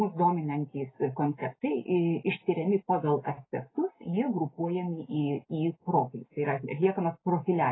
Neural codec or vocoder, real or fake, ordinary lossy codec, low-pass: none; real; AAC, 16 kbps; 7.2 kHz